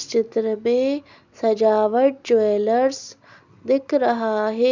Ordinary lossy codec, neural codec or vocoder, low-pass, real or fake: none; none; 7.2 kHz; real